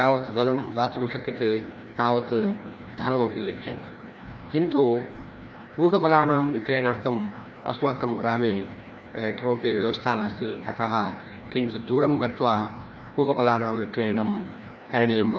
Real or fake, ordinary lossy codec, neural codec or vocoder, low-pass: fake; none; codec, 16 kHz, 1 kbps, FreqCodec, larger model; none